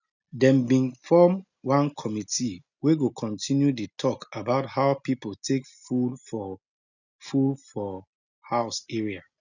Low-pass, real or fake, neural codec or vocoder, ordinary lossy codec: 7.2 kHz; real; none; none